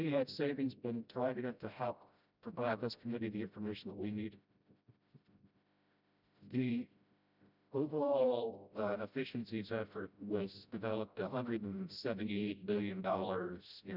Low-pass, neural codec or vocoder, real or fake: 5.4 kHz; codec, 16 kHz, 0.5 kbps, FreqCodec, smaller model; fake